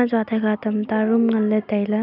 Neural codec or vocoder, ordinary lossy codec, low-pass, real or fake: none; none; 5.4 kHz; real